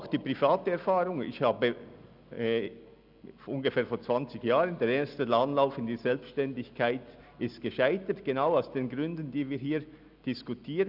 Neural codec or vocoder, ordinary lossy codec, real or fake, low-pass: none; none; real; 5.4 kHz